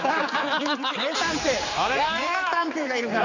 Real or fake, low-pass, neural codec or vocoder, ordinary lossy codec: fake; 7.2 kHz; codec, 16 kHz, 4 kbps, X-Codec, HuBERT features, trained on general audio; Opus, 64 kbps